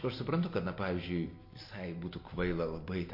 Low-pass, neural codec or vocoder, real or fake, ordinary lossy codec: 5.4 kHz; none; real; MP3, 32 kbps